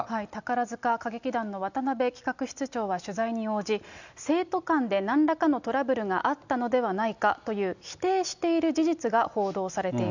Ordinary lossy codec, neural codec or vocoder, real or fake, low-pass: none; none; real; 7.2 kHz